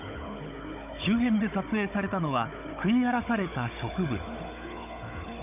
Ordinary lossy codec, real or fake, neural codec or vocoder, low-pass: none; fake; codec, 16 kHz, 16 kbps, FunCodec, trained on Chinese and English, 50 frames a second; 3.6 kHz